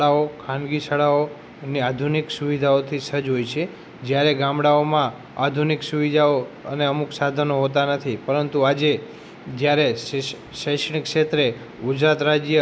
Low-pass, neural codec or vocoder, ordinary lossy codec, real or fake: none; none; none; real